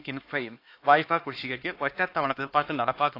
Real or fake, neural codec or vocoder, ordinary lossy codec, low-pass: fake; codec, 16 kHz, 2 kbps, X-Codec, HuBERT features, trained on LibriSpeech; AAC, 32 kbps; 5.4 kHz